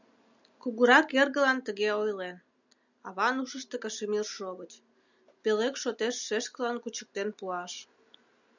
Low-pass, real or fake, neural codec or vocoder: 7.2 kHz; real; none